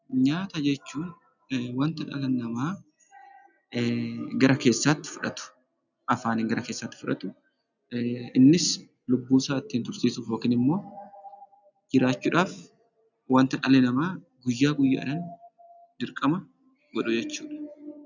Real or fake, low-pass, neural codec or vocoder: real; 7.2 kHz; none